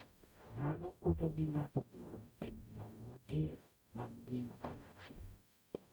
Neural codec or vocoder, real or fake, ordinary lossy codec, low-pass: codec, 44.1 kHz, 0.9 kbps, DAC; fake; none; none